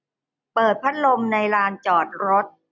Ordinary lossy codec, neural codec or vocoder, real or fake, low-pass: none; none; real; 7.2 kHz